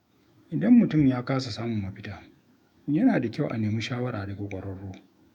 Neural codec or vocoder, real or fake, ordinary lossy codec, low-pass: autoencoder, 48 kHz, 128 numbers a frame, DAC-VAE, trained on Japanese speech; fake; none; 19.8 kHz